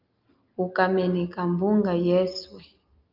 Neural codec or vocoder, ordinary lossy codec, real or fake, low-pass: none; Opus, 24 kbps; real; 5.4 kHz